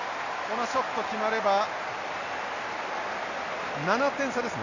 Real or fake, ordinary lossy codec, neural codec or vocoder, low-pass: real; none; none; 7.2 kHz